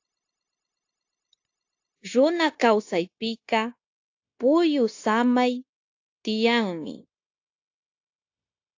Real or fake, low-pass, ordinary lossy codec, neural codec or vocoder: fake; 7.2 kHz; AAC, 48 kbps; codec, 16 kHz, 0.9 kbps, LongCat-Audio-Codec